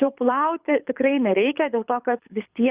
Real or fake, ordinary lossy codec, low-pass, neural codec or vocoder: fake; Opus, 64 kbps; 3.6 kHz; codec, 24 kHz, 6 kbps, HILCodec